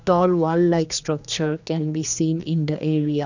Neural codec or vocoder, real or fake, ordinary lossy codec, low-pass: codec, 16 kHz, 2 kbps, X-Codec, HuBERT features, trained on general audio; fake; none; 7.2 kHz